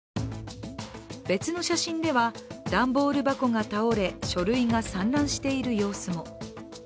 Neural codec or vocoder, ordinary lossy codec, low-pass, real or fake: none; none; none; real